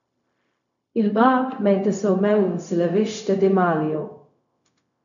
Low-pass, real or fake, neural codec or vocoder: 7.2 kHz; fake; codec, 16 kHz, 0.4 kbps, LongCat-Audio-Codec